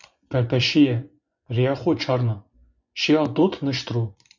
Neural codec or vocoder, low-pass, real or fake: vocoder, 24 kHz, 100 mel bands, Vocos; 7.2 kHz; fake